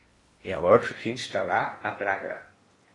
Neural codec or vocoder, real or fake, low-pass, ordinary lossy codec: codec, 16 kHz in and 24 kHz out, 0.8 kbps, FocalCodec, streaming, 65536 codes; fake; 10.8 kHz; AAC, 32 kbps